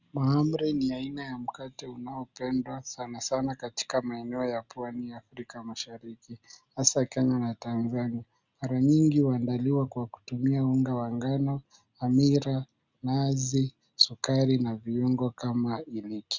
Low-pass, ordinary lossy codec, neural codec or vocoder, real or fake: 7.2 kHz; Opus, 64 kbps; none; real